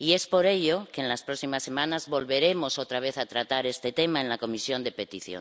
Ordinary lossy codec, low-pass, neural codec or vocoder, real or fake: none; none; none; real